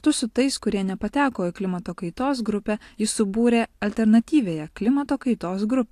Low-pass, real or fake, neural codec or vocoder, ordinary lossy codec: 14.4 kHz; real; none; AAC, 64 kbps